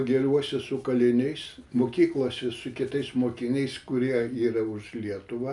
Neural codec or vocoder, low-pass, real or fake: none; 10.8 kHz; real